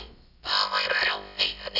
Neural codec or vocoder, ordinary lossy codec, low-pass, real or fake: codec, 16 kHz, about 1 kbps, DyCAST, with the encoder's durations; none; 5.4 kHz; fake